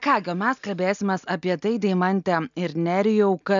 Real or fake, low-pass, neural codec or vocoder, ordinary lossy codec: real; 7.2 kHz; none; MP3, 96 kbps